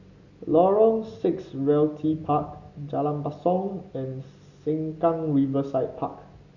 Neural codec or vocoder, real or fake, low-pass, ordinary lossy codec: none; real; 7.2 kHz; Opus, 64 kbps